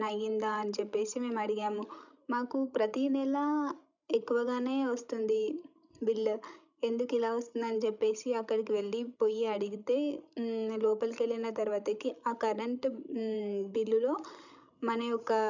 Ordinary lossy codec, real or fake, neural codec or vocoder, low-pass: none; fake; codec, 16 kHz, 16 kbps, FreqCodec, larger model; 7.2 kHz